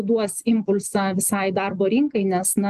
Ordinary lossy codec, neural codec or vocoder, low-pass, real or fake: Opus, 24 kbps; none; 14.4 kHz; real